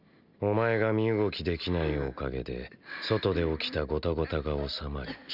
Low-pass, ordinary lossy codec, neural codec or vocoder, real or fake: 5.4 kHz; none; none; real